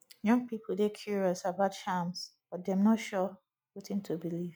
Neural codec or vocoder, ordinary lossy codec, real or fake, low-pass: none; none; real; none